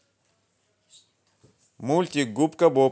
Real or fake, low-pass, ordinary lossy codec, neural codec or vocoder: real; none; none; none